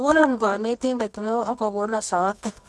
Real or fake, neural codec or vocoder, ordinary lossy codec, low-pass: fake; codec, 24 kHz, 0.9 kbps, WavTokenizer, medium music audio release; none; none